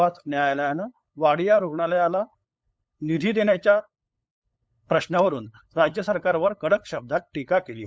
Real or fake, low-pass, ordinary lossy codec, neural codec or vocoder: fake; none; none; codec, 16 kHz, 8 kbps, FunCodec, trained on LibriTTS, 25 frames a second